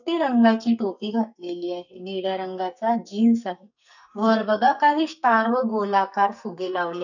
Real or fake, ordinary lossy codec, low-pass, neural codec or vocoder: fake; none; 7.2 kHz; codec, 44.1 kHz, 2.6 kbps, SNAC